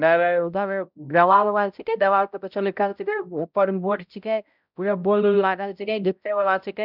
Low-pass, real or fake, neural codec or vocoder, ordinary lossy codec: 5.4 kHz; fake; codec, 16 kHz, 0.5 kbps, X-Codec, HuBERT features, trained on balanced general audio; none